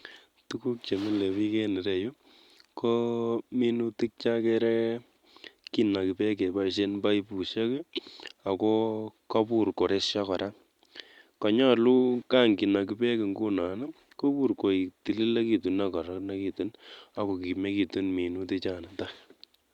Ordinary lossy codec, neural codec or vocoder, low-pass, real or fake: Opus, 64 kbps; none; 19.8 kHz; real